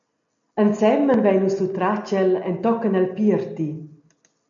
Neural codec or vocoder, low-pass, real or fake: none; 7.2 kHz; real